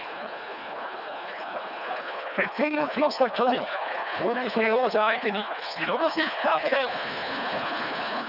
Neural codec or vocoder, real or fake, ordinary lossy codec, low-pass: codec, 24 kHz, 1.5 kbps, HILCodec; fake; none; 5.4 kHz